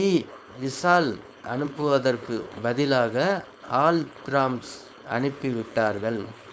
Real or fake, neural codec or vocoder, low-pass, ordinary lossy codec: fake; codec, 16 kHz, 4.8 kbps, FACodec; none; none